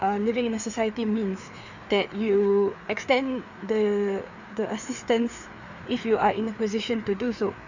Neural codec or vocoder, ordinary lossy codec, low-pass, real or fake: codec, 16 kHz, 4 kbps, FreqCodec, larger model; none; 7.2 kHz; fake